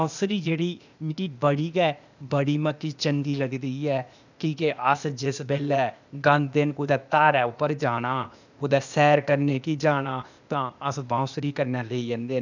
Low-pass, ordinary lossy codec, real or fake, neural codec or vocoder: 7.2 kHz; none; fake; codec, 16 kHz, 0.8 kbps, ZipCodec